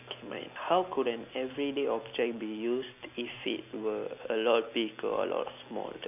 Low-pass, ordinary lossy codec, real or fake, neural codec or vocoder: 3.6 kHz; none; real; none